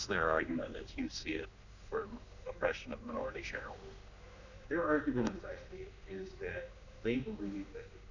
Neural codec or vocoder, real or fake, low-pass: codec, 16 kHz, 2 kbps, X-Codec, HuBERT features, trained on general audio; fake; 7.2 kHz